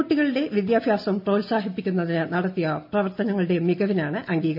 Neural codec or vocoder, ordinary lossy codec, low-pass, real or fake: none; MP3, 48 kbps; 5.4 kHz; real